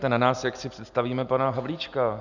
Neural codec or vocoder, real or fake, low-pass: none; real; 7.2 kHz